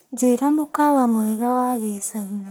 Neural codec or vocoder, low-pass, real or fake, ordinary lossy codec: codec, 44.1 kHz, 1.7 kbps, Pupu-Codec; none; fake; none